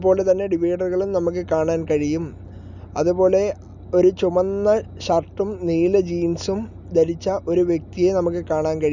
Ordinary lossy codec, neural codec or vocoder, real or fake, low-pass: none; none; real; 7.2 kHz